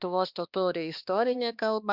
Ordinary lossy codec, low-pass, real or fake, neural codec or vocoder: Opus, 64 kbps; 5.4 kHz; fake; codec, 16 kHz, 2 kbps, X-Codec, HuBERT features, trained on balanced general audio